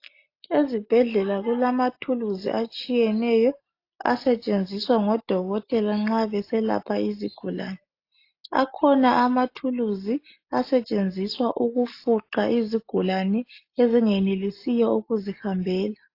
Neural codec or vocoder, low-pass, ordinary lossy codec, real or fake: none; 5.4 kHz; AAC, 32 kbps; real